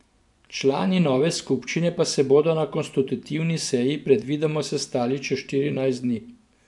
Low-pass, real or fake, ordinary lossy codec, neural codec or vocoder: 10.8 kHz; fake; none; vocoder, 24 kHz, 100 mel bands, Vocos